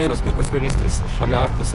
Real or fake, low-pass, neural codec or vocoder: fake; 10.8 kHz; codec, 24 kHz, 0.9 kbps, WavTokenizer, medium music audio release